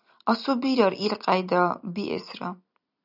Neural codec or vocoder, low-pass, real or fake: none; 5.4 kHz; real